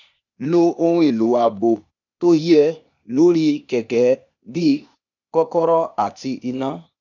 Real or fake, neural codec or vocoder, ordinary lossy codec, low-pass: fake; codec, 16 kHz, 0.8 kbps, ZipCodec; none; 7.2 kHz